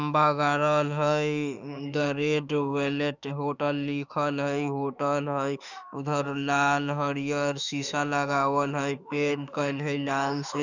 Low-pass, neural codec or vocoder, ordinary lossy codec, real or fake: 7.2 kHz; autoencoder, 48 kHz, 32 numbers a frame, DAC-VAE, trained on Japanese speech; none; fake